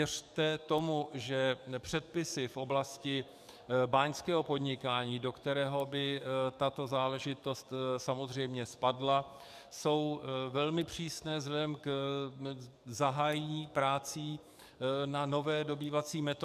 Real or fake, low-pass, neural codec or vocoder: fake; 14.4 kHz; codec, 44.1 kHz, 7.8 kbps, DAC